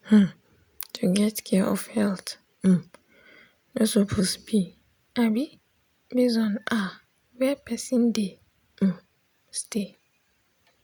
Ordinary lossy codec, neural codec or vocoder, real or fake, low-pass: none; none; real; none